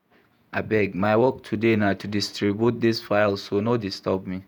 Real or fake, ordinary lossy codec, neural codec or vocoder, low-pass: fake; none; vocoder, 48 kHz, 128 mel bands, Vocos; none